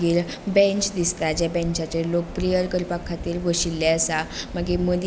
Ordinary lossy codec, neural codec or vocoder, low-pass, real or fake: none; none; none; real